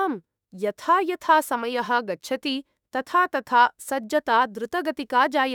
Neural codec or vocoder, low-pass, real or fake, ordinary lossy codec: autoencoder, 48 kHz, 32 numbers a frame, DAC-VAE, trained on Japanese speech; 19.8 kHz; fake; none